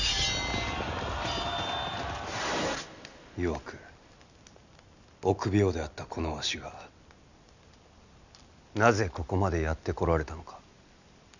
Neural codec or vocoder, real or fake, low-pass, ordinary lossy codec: none; real; 7.2 kHz; none